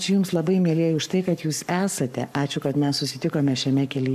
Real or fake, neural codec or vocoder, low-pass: fake; codec, 44.1 kHz, 7.8 kbps, Pupu-Codec; 14.4 kHz